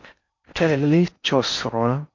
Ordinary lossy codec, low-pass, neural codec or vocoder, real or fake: MP3, 48 kbps; 7.2 kHz; codec, 16 kHz in and 24 kHz out, 0.6 kbps, FocalCodec, streaming, 4096 codes; fake